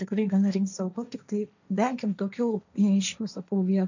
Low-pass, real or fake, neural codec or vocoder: 7.2 kHz; fake; codec, 16 kHz, 1.1 kbps, Voila-Tokenizer